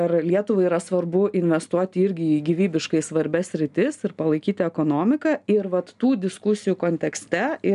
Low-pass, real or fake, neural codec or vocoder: 10.8 kHz; real; none